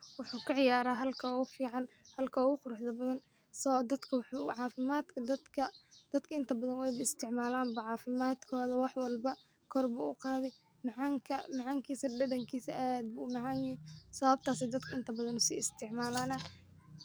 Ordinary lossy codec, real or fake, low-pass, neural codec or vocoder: none; fake; none; codec, 44.1 kHz, 7.8 kbps, DAC